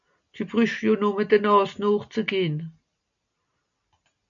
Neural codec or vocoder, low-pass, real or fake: none; 7.2 kHz; real